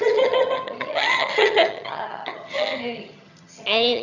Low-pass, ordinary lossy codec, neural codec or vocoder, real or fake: 7.2 kHz; none; vocoder, 22.05 kHz, 80 mel bands, HiFi-GAN; fake